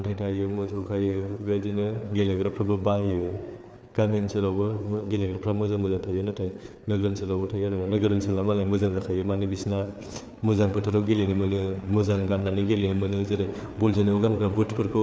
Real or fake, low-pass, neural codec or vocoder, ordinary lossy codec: fake; none; codec, 16 kHz, 4 kbps, FreqCodec, larger model; none